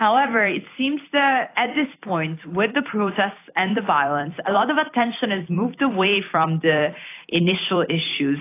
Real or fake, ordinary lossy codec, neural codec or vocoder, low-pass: fake; AAC, 24 kbps; vocoder, 44.1 kHz, 128 mel bands every 256 samples, BigVGAN v2; 3.6 kHz